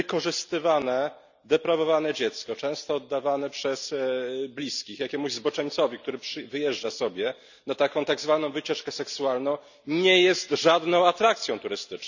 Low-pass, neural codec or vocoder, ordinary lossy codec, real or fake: 7.2 kHz; none; none; real